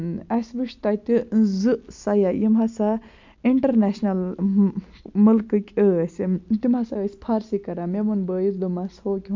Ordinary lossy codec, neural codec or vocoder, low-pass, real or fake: MP3, 64 kbps; none; 7.2 kHz; real